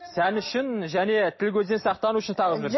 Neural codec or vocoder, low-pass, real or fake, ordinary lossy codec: none; 7.2 kHz; real; MP3, 24 kbps